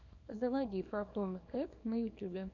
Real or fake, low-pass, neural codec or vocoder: fake; 7.2 kHz; codec, 24 kHz, 0.9 kbps, WavTokenizer, small release